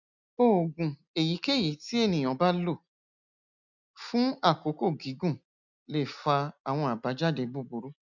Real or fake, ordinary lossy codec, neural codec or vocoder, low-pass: real; none; none; 7.2 kHz